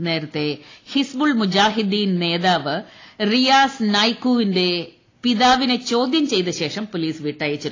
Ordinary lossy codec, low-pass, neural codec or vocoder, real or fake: AAC, 32 kbps; 7.2 kHz; none; real